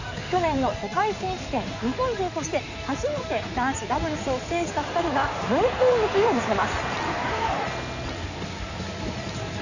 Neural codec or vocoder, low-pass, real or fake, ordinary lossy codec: codec, 16 kHz in and 24 kHz out, 2.2 kbps, FireRedTTS-2 codec; 7.2 kHz; fake; none